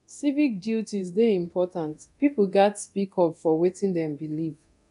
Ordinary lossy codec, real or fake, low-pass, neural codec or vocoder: none; fake; 10.8 kHz; codec, 24 kHz, 0.9 kbps, DualCodec